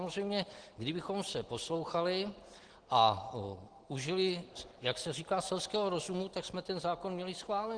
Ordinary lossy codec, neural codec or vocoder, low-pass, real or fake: Opus, 16 kbps; none; 9.9 kHz; real